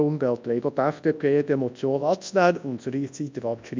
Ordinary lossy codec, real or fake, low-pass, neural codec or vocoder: MP3, 64 kbps; fake; 7.2 kHz; codec, 24 kHz, 0.9 kbps, WavTokenizer, large speech release